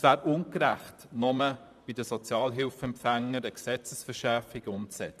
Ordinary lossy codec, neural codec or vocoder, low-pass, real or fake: none; vocoder, 44.1 kHz, 128 mel bands, Pupu-Vocoder; 14.4 kHz; fake